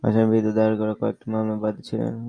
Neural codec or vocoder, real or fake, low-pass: none; real; 9.9 kHz